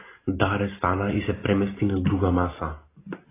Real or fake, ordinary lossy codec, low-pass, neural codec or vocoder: real; AAC, 16 kbps; 3.6 kHz; none